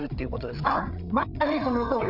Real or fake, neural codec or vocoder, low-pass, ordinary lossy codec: fake; codec, 16 kHz, 8 kbps, FreqCodec, larger model; 5.4 kHz; none